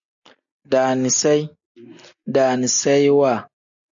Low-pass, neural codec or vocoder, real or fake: 7.2 kHz; none; real